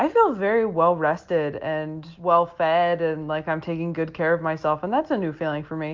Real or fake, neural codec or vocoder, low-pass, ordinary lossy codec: real; none; 7.2 kHz; Opus, 24 kbps